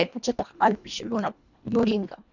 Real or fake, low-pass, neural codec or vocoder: fake; 7.2 kHz; codec, 24 kHz, 1.5 kbps, HILCodec